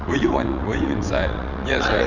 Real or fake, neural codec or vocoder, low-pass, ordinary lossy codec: fake; vocoder, 22.05 kHz, 80 mel bands, WaveNeXt; 7.2 kHz; none